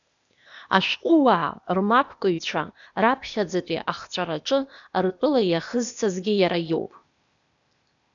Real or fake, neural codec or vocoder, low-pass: fake; codec, 16 kHz, 0.8 kbps, ZipCodec; 7.2 kHz